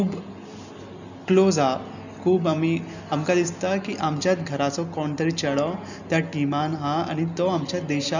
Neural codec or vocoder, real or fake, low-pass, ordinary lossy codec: none; real; 7.2 kHz; none